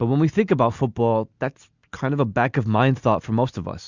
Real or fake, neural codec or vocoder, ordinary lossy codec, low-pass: real; none; Opus, 64 kbps; 7.2 kHz